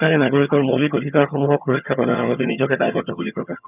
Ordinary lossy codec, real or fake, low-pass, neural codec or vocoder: none; fake; 3.6 kHz; vocoder, 22.05 kHz, 80 mel bands, HiFi-GAN